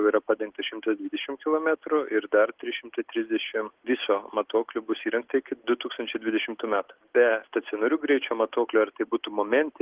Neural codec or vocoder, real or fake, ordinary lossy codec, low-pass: none; real; Opus, 16 kbps; 3.6 kHz